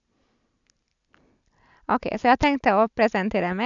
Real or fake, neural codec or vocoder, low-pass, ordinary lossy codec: real; none; 7.2 kHz; none